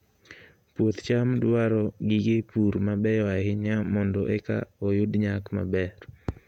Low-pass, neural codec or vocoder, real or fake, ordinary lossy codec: 19.8 kHz; vocoder, 48 kHz, 128 mel bands, Vocos; fake; none